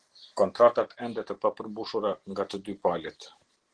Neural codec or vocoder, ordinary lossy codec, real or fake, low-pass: none; Opus, 16 kbps; real; 9.9 kHz